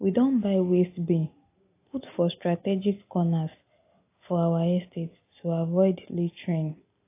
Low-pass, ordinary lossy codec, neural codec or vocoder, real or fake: 3.6 kHz; AAC, 24 kbps; none; real